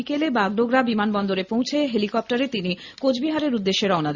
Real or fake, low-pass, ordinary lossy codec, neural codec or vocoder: real; 7.2 kHz; Opus, 64 kbps; none